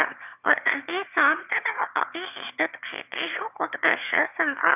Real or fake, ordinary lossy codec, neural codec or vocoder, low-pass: fake; none; autoencoder, 22.05 kHz, a latent of 192 numbers a frame, VITS, trained on one speaker; 3.6 kHz